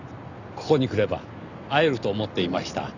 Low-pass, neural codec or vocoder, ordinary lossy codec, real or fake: 7.2 kHz; none; none; real